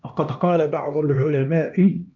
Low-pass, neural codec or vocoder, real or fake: 7.2 kHz; codec, 16 kHz, 1 kbps, X-Codec, HuBERT features, trained on LibriSpeech; fake